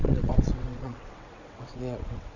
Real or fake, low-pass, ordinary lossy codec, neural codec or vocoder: fake; 7.2 kHz; none; vocoder, 22.05 kHz, 80 mel bands, WaveNeXt